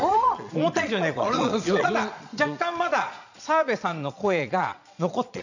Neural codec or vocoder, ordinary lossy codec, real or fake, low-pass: vocoder, 22.05 kHz, 80 mel bands, Vocos; none; fake; 7.2 kHz